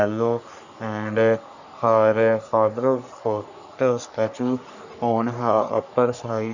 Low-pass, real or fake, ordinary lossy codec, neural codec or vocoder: 7.2 kHz; fake; none; codec, 44.1 kHz, 3.4 kbps, Pupu-Codec